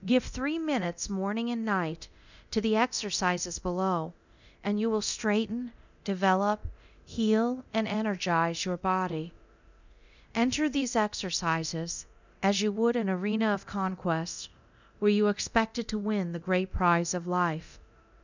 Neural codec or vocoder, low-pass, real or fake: codec, 24 kHz, 0.9 kbps, DualCodec; 7.2 kHz; fake